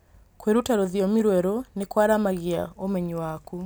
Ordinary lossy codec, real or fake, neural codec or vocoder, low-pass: none; real; none; none